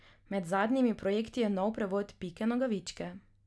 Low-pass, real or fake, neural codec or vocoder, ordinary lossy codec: none; real; none; none